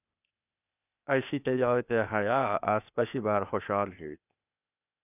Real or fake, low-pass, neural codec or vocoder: fake; 3.6 kHz; codec, 16 kHz, 0.8 kbps, ZipCodec